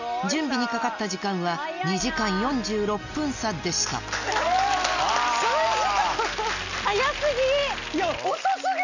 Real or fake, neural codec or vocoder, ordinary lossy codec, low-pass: real; none; none; 7.2 kHz